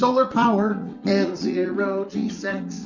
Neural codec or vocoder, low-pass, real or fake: vocoder, 44.1 kHz, 80 mel bands, Vocos; 7.2 kHz; fake